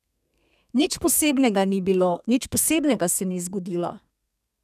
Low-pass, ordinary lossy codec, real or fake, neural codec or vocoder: 14.4 kHz; none; fake; codec, 32 kHz, 1.9 kbps, SNAC